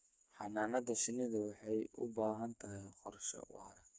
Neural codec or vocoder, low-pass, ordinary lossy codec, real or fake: codec, 16 kHz, 4 kbps, FreqCodec, smaller model; none; none; fake